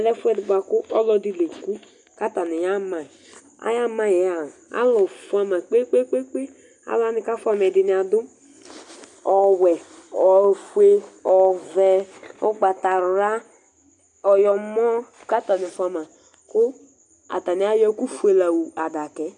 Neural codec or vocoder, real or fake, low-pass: none; real; 10.8 kHz